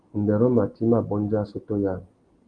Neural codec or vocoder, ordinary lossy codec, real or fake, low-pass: none; Opus, 24 kbps; real; 9.9 kHz